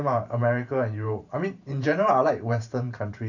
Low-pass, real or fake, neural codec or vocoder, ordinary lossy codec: 7.2 kHz; fake; vocoder, 44.1 kHz, 128 mel bands every 512 samples, BigVGAN v2; none